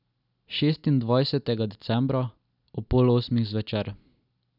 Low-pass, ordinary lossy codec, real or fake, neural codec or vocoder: 5.4 kHz; none; real; none